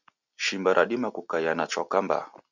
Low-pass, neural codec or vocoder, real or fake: 7.2 kHz; none; real